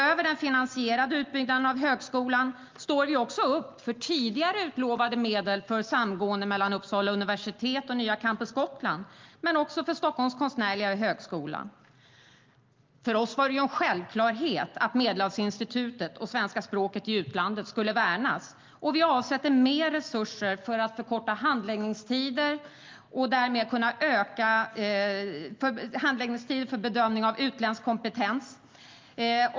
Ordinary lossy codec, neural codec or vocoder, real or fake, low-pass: Opus, 32 kbps; none; real; 7.2 kHz